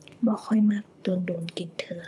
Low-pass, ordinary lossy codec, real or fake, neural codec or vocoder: none; none; fake; codec, 24 kHz, 3 kbps, HILCodec